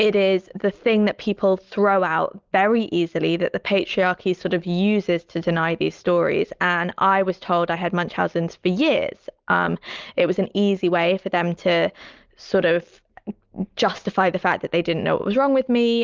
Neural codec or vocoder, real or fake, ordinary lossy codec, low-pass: none; real; Opus, 24 kbps; 7.2 kHz